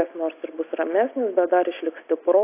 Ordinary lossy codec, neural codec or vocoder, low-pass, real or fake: AAC, 24 kbps; none; 3.6 kHz; real